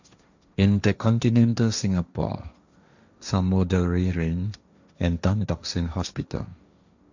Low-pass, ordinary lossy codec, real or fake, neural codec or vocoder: 7.2 kHz; none; fake; codec, 16 kHz, 1.1 kbps, Voila-Tokenizer